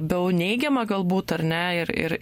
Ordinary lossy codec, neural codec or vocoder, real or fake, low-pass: MP3, 64 kbps; none; real; 19.8 kHz